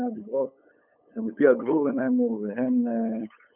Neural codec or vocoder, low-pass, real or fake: codec, 16 kHz, 8 kbps, FunCodec, trained on LibriTTS, 25 frames a second; 3.6 kHz; fake